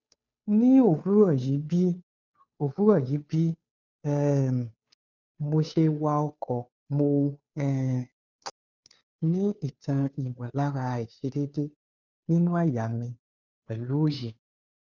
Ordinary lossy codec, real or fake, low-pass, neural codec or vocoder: none; fake; 7.2 kHz; codec, 16 kHz, 2 kbps, FunCodec, trained on Chinese and English, 25 frames a second